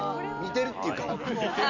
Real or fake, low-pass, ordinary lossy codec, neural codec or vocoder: real; 7.2 kHz; none; none